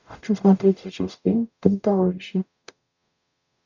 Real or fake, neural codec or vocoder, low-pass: fake; codec, 44.1 kHz, 0.9 kbps, DAC; 7.2 kHz